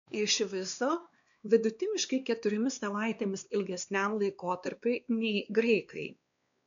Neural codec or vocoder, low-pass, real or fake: codec, 16 kHz, 2 kbps, X-Codec, WavLM features, trained on Multilingual LibriSpeech; 7.2 kHz; fake